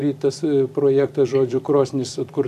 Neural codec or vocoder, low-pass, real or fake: vocoder, 44.1 kHz, 128 mel bands every 256 samples, BigVGAN v2; 14.4 kHz; fake